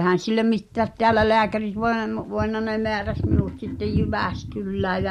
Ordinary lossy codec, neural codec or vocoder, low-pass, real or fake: MP3, 64 kbps; none; 19.8 kHz; real